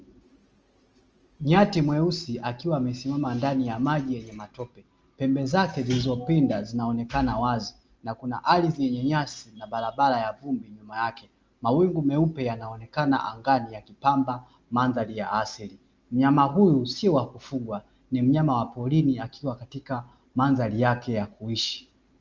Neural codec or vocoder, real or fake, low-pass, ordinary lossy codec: none; real; 7.2 kHz; Opus, 24 kbps